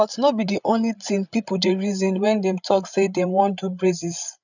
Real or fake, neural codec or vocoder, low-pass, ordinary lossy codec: fake; codec, 16 kHz, 8 kbps, FreqCodec, larger model; 7.2 kHz; none